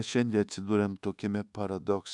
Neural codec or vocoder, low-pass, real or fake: codec, 24 kHz, 1.2 kbps, DualCodec; 10.8 kHz; fake